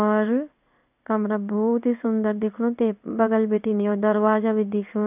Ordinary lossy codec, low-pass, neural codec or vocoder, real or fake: none; 3.6 kHz; codec, 16 kHz in and 24 kHz out, 1 kbps, XY-Tokenizer; fake